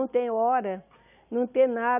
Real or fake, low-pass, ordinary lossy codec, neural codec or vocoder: real; 3.6 kHz; none; none